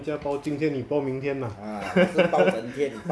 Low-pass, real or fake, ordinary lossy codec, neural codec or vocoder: none; real; none; none